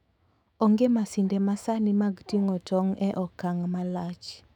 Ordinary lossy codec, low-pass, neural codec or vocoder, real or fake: none; 19.8 kHz; autoencoder, 48 kHz, 128 numbers a frame, DAC-VAE, trained on Japanese speech; fake